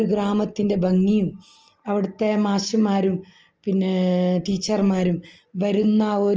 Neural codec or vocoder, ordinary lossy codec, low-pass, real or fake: none; Opus, 24 kbps; 7.2 kHz; real